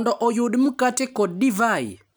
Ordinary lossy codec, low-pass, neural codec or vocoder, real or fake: none; none; none; real